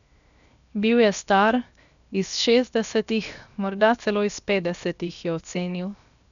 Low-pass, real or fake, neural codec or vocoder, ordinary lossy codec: 7.2 kHz; fake; codec, 16 kHz, 0.7 kbps, FocalCodec; none